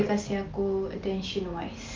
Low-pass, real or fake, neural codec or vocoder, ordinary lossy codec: 7.2 kHz; real; none; Opus, 24 kbps